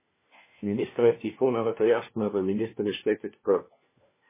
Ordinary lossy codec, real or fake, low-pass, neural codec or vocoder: MP3, 16 kbps; fake; 3.6 kHz; codec, 16 kHz, 1 kbps, FunCodec, trained on LibriTTS, 50 frames a second